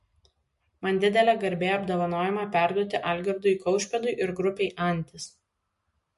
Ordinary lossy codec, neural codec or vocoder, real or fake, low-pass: MP3, 48 kbps; none; real; 14.4 kHz